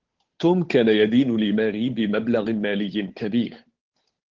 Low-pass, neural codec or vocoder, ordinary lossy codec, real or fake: 7.2 kHz; codec, 16 kHz, 8 kbps, FunCodec, trained on Chinese and English, 25 frames a second; Opus, 16 kbps; fake